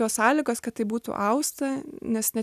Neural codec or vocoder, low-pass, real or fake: none; 14.4 kHz; real